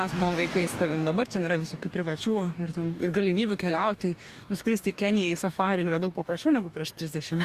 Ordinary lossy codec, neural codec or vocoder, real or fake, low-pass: Opus, 64 kbps; codec, 44.1 kHz, 2.6 kbps, DAC; fake; 14.4 kHz